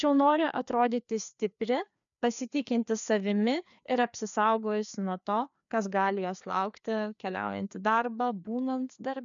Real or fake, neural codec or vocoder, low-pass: fake; codec, 16 kHz, 2 kbps, FreqCodec, larger model; 7.2 kHz